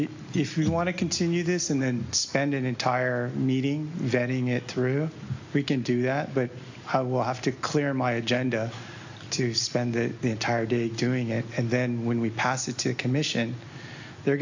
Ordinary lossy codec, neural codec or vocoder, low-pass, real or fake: AAC, 48 kbps; none; 7.2 kHz; real